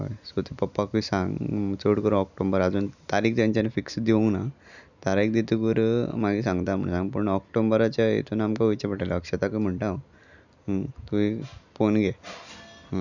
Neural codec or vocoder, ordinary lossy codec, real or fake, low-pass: none; none; real; 7.2 kHz